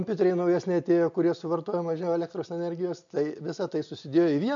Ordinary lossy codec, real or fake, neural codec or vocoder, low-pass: MP3, 64 kbps; real; none; 7.2 kHz